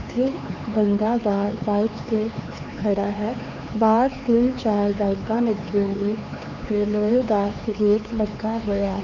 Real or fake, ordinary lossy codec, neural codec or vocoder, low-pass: fake; none; codec, 16 kHz, 4 kbps, X-Codec, HuBERT features, trained on LibriSpeech; 7.2 kHz